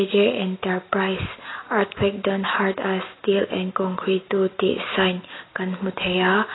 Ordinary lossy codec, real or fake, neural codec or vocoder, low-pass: AAC, 16 kbps; real; none; 7.2 kHz